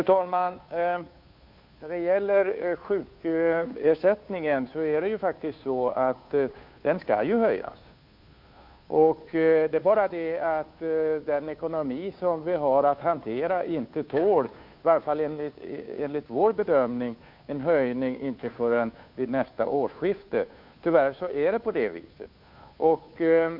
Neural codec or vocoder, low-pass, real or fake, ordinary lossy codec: codec, 16 kHz, 2 kbps, FunCodec, trained on Chinese and English, 25 frames a second; 5.4 kHz; fake; MP3, 48 kbps